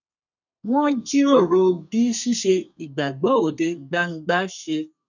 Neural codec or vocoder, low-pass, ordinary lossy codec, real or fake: codec, 32 kHz, 1.9 kbps, SNAC; 7.2 kHz; none; fake